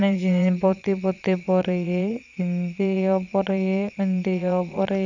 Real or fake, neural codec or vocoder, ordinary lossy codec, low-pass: fake; vocoder, 22.05 kHz, 80 mel bands, Vocos; none; 7.2 kHz